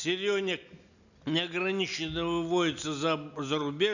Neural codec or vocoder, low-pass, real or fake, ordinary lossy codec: none; 7.2 kHz; real; none